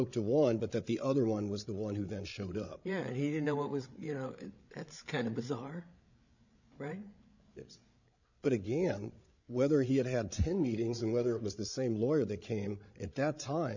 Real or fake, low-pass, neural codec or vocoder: fake; 7.2 kHz; codec, 16 kHz, 16 kbps, FreqCodec, larger model